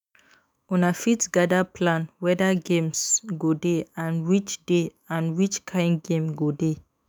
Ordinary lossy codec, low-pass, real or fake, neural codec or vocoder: none; none; fake; autoencoder, 48 kHz, 128 numbers a frame, DAC-VAE, trained on Japanese speech